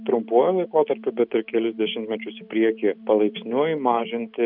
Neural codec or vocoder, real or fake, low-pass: none; real; 5.4 kHz